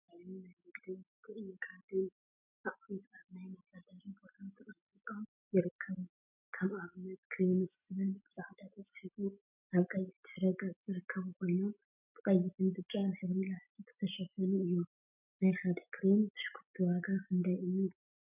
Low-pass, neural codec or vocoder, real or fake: 3.6 kHz; none; real